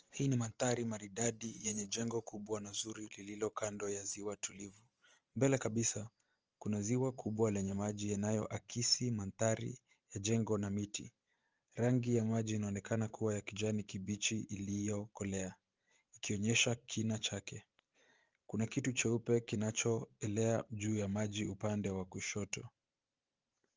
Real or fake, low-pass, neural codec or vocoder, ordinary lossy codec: fake; 7.2 kHz; vocoder, 44.1 kHz, 128 mel bands every 512 samples, BigVGAN v2; Opus, 24 kbps